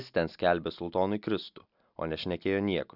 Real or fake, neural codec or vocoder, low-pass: real; none; 5.4 kHz